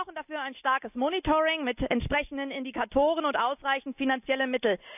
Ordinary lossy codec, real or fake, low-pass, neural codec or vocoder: none; real; 3.6 kHz; none